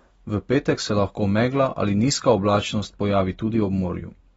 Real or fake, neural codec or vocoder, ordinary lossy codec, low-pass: real; none; AAC, 24 kbps; 19.8 kHz